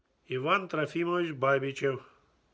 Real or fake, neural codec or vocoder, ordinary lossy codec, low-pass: real; none; none; none